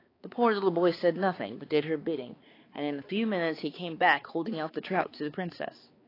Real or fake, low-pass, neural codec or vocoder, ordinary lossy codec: fake; 5.4 kHz; codec, 16 kHz, 4 kbps, X-Codec, HuBERT features, trained on balanced general audio; AAC, 24 kbps